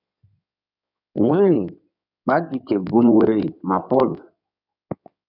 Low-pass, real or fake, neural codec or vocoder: 5.4 kHz; fake; codec, 16 kHz in and 24 kHz out, 2.2 kbps, FireRedTTS-2 codec